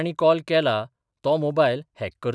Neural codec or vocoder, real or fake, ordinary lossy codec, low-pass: none; real; none; none